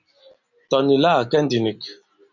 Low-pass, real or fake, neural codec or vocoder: 7.2 kHz; real; none